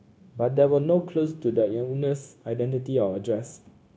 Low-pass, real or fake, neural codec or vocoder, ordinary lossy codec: none; fake; codec, 16 kHz, 0.9 kbps, LongCat-Audio-Codec; none